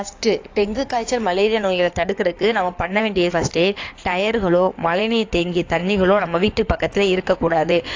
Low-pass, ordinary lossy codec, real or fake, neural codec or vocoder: 7.2 kHz; AAC, 48 kbps; fake; codec, 16 kHz in and 24 kHz out, 2.2 kbps, FireRedTTS-2 codec